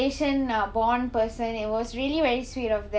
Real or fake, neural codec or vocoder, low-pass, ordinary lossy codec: real; none; none; none